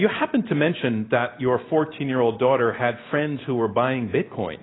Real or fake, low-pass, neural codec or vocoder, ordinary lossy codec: real; 7.2 kHz; none; AAC, 16 kbps